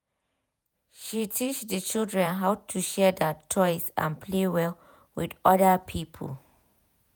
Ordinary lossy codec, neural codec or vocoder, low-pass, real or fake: none; vocoder, 48 kHz, 128 mel bands, Vocos; none; fake